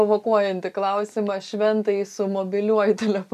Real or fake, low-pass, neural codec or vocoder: real; 14.4 kHz; none